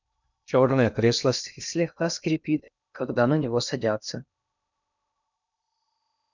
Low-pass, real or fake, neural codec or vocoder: 7.2 kHz; fake; codec, 16 kHz in and 24 kHz out, 0.6 kbps, FocalCodec, streaming, 2048 codes